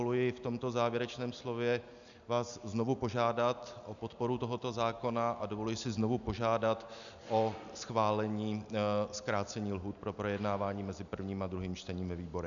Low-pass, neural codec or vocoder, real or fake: 7.2 kHz; none; real